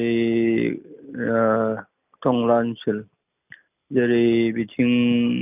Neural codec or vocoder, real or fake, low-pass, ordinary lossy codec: none; real; 3.6 kHz; none